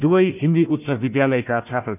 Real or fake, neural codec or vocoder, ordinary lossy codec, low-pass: fake; codec, 16 kHz, 1 kbps, FunCodec, trained on Chinese and English, 50 frames a second; none; 3.6 kHz